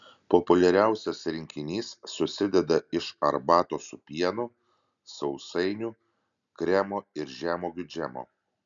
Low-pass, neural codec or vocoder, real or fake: 7.2 kHz; none; real